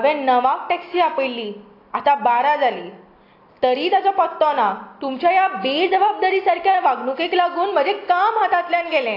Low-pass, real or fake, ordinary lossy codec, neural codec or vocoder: 5.4 kHz; real; AAC, 32 kbps; none